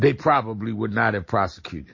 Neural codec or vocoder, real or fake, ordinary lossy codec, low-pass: none; real; MP3, 32 kbps; 7.2 kHz